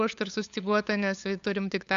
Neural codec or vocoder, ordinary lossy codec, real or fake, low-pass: codec, 16 kHz, 8 kbps, FunCodec, trained on LibriTTS, 25 frames a second; AAC, 64 kbps; fake; 7.2 kHz